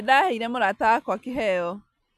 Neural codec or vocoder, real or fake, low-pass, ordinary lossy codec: none; real; 14.4 kHz; none